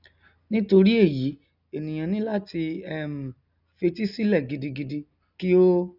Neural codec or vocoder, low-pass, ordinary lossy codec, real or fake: none; 5.4 kHz; none; real